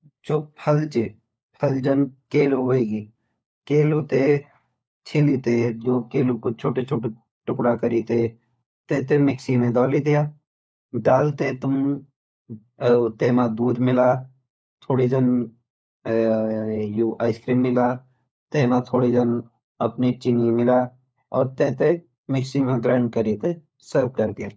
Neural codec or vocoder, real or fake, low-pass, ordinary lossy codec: codec, 16 kHz, 4 kbps, FunCodec, trained on LibriTTS, 50 frames a second; fake; none; none